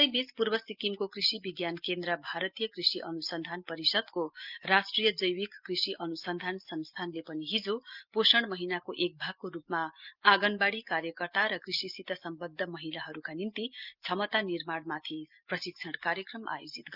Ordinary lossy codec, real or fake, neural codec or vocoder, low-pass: Opus, 24 kbps; real; none; 5.4 kHz